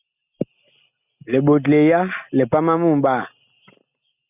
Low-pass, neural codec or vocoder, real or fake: 3.6 kHz; none; real